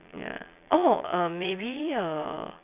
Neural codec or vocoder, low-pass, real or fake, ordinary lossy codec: vocoder, 22.05 kHz, 80 mel bands, Vocos; 3.6 kHz; fake; none